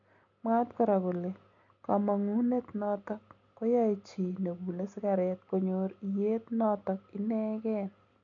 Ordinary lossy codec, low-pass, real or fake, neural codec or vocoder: none; 7.2 kHz; real; none